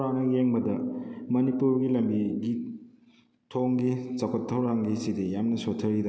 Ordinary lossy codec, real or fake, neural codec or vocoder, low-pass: none; real; none; none